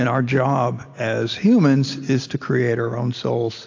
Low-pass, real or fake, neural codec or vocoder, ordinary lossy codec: 7.2 kHz; real; none; AAC, 48 kbps